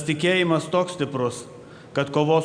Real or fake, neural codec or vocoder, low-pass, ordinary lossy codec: real; none; 9.9 kHz; Opus, 64 kbps